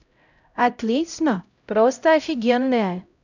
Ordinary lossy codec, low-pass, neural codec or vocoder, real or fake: none; 7.2 kHz; codec, 16 kHz, 0.5 kbps, X-Codec, HuBERT features, trained on LibriSpeech; fake